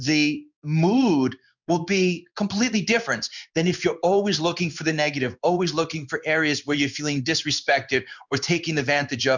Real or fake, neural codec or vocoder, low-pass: fake; codec, 16 kHz in and 24 kHz out, 1 kbps, XY-Tokenizer; 7.2 kHz